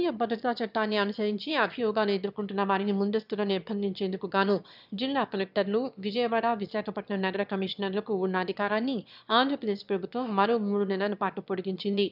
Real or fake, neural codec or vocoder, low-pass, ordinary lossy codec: fake; autoencoder, 22.05 kHz, a latent of 192 numbers a frame, VITS, trained on one speaker; 5.4 kHz; none